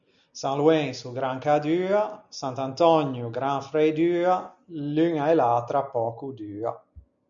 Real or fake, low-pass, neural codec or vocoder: real; 7.2 kHz; none